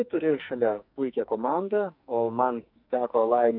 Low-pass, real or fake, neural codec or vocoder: 5.4 kHz; fake; codec, 44.1 kHz, 2.6 kbps, SNAC